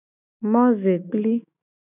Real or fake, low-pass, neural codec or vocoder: fake; 3.6 kHz; autoencoder, 48 kHz, 128 numbers a frame, DAC-VAE, trained on Japanese speech